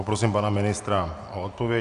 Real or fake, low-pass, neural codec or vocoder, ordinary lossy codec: real; 10.8 kHz; none; Opus, 64 kbps